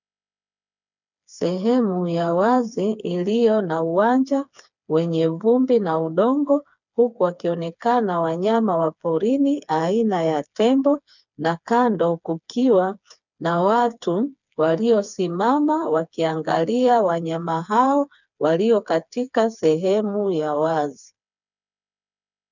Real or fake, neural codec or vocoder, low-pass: fake; codec, 16 kHz, 4 kbps, FreqCodec, smaller model; 7.2 kHz